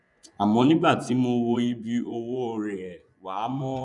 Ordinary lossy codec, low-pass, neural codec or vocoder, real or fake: none; 10.8 kHz; vocoder, 24 kHz, 100 mel bands, Vocos; fake